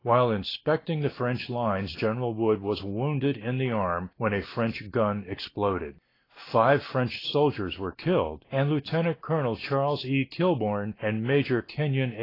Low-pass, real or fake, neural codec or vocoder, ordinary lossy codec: 5.4 kHz; real; none; AAC, 24 kbps